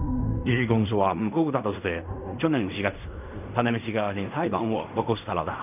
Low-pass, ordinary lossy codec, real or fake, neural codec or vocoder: 3.6 kHz; none; fake; codec, 16 kHz in and 24 kHz out, 0.4 kbps, LongCat-Audio-Codec, fine tuned four codebook decoder